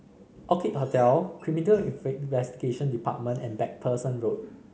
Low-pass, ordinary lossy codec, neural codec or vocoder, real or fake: none; none; none; real